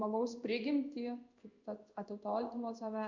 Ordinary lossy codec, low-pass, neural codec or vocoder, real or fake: Opus, 64 kbps; 7.2 kHz; codec, 16 kHz in and 24 kHz out, 1 kbps, XY-Tokenizer; fake